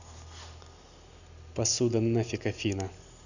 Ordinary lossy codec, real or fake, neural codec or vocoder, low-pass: none; real; none; 7.2 kHz